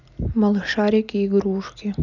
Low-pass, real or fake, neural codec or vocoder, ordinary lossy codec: 7.2 kHz; real; none; none